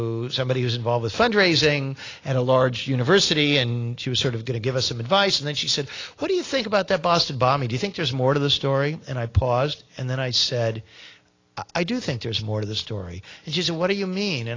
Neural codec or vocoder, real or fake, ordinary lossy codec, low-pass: none; real; AAC, 32 kbps; 7.2 kHz